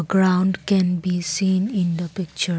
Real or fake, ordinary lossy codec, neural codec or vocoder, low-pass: real; none; none; none